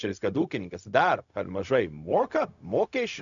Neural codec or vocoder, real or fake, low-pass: codec, 16 kHz, 0.4 kbps, LongCat-Audio-Codec; fake; 7.2 kHz